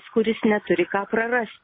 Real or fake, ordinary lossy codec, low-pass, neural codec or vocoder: real; MP3, 24 kbps; 5.4 kHz; none